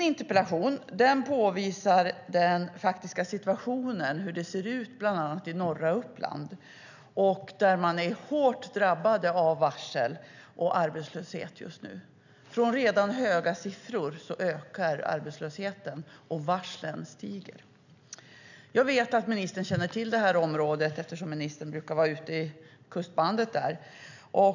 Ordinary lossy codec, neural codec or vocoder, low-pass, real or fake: none; none; 7.2 kHz; real